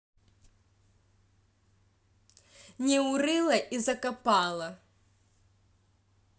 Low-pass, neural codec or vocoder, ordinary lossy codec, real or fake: none; none; none; real